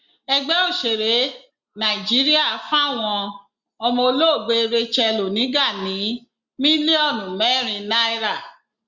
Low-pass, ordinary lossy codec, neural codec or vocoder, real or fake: 7.2 kHz; Opus, 64 kbps; none; real